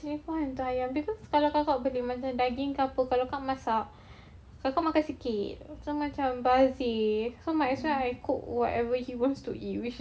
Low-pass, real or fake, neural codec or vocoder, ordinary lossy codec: none; real; none; none